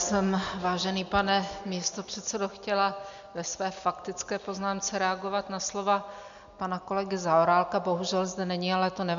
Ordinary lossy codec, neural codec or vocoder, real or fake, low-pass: MP3, 64 kbps; none; real; 7.2 kHz